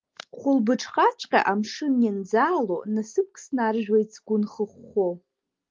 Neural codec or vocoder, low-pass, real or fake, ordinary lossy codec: none; 7.2 kHz; real; Opus, 32 kbps